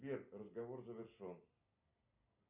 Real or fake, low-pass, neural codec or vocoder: real; 3.6 kHz; none